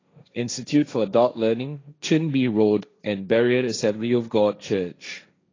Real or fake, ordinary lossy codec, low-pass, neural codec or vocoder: fake; AAC, 32 kbps; 7.2 kHz; codec, 16 kHz, 1.1 kbps, Voila-Tokenizer